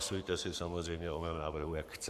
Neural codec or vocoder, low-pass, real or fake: codec, 44.1 kHz, 7.8 kbps, Pupu-Codec; 14.4 kHz; fake